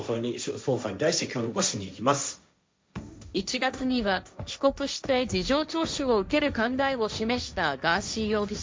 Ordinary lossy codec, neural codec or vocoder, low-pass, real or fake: none; codec, 16 kHz, 1.1 kbps, Voila-Tokenizer; none; fake